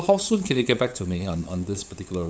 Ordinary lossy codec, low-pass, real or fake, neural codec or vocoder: none; none; fake; codec, 16 kHz, 8 kbps, FunCodec, trained on LibriTTS, 25 frames a second